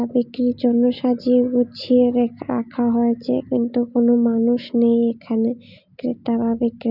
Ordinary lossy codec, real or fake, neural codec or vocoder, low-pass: none; real; none; 5.4 kHz